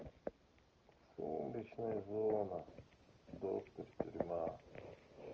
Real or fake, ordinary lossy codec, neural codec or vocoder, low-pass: real; Opus, 24 kbps; none; 7.2 kHz